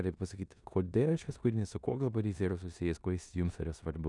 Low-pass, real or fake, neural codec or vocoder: 10.8 kHz; fake; codec, 16 kHz in and 24 kHz out, 0.9 kbps, LongCat-Audio-Codec, four codebook decoder